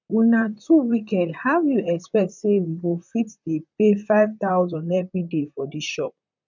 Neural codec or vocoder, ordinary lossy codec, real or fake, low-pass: vocoder, 44.1 kHz, 128 mel bands, Pupu-Vocoder; none; fake; 7.2 kHz